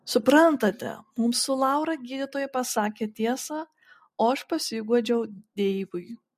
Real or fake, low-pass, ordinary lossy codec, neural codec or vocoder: real; 14.4 kHz; MP3, 64 kbps; none